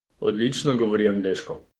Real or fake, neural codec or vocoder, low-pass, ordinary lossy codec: fake; autoencoder, 48 kHz, 32 numbers a frame, DAC-VAE, trained on Japanese speech; 19.8 kHz; Opus, 16 kbps